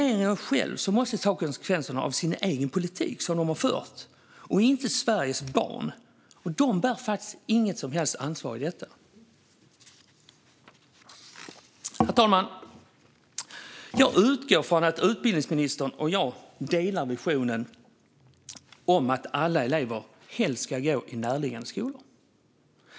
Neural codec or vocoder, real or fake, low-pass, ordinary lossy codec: none; real; none; none